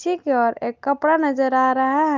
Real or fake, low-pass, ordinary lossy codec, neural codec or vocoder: real; 7.2 kHz; Opus, 32 kbps; none